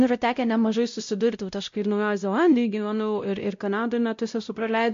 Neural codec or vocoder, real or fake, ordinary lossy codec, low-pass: codec, 16 kHz, 0.5 kbps, X-Codec, WavLM features, trained on Multilingual LibriSpeech; fake; MP3, 48 kbps; 7.2 kHz